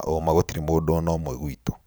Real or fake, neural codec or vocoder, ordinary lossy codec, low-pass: fake; vocoder, 44.1 kHz, 128 mel bands every 512 samples, BigVGAN v2; none; none